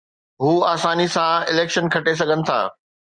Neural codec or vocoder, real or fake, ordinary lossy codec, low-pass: none; real; Opus, 64 kbps; 9.9 kHz